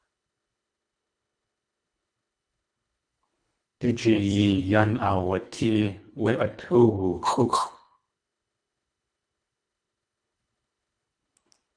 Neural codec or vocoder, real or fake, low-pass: codec, 24 kHz, 1.5 kbps, HILCodec; fake; 9.9 kHz